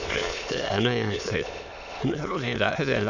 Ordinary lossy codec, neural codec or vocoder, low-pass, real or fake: none; autoencoder, 22.05 kHz, a latent of 192 numbers a frame, VITS, trained on many speakers; 7.2 kHz; fake